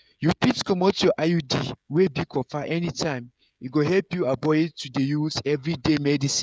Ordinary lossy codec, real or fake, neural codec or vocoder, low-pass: none; fake; codec, 16 kHz, 16 kbps, FreqCodec, smaller model; none